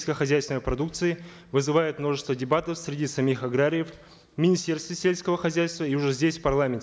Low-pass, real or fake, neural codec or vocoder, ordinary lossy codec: none; real; none; none